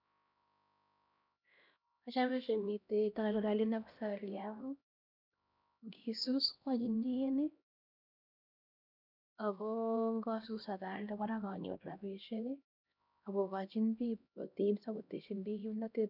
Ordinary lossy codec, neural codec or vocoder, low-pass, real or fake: none; codec, 16 kHz, 1 kbps, X-Codec, HuBERT features, trained on LibriSpeech; 5.4 kHz; fake